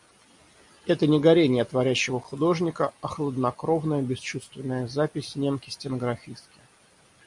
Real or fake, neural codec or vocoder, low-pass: real; none; 10.8 kHz